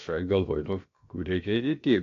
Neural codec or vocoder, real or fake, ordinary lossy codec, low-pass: codec, 16 kHz, about 1 kbps, DyCAST, with the encoder's durations; fake; AAC, 48 kbps; 7.2 kHz